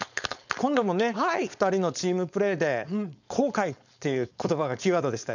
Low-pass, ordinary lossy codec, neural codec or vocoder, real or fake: 7.2 kHz; none; codec, 16 kHz, 4.8 kbps, FACodec; fake